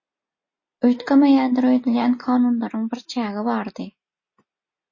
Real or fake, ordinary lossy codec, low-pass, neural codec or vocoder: real; MP3, 32 kbps; 7.2 kHz; none